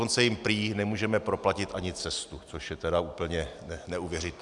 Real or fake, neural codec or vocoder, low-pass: real; none; 10.8 kHz